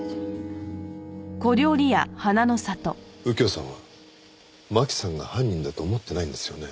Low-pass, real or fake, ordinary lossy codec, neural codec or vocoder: none; real; none; none